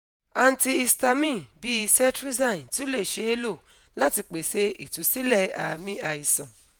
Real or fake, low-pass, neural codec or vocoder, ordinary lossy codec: fake; none; vocoder, 48 kHz, 128 mel bands, Vocos; none